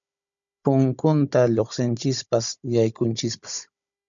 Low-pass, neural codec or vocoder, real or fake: 7.2 kHz; codec, 16 kHz, 16 kbps, FunCodec, trained on Chinese and English, 50 frames a second; fake